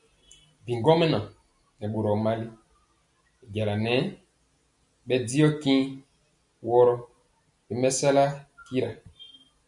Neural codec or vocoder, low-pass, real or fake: none; 10.8 kHz; real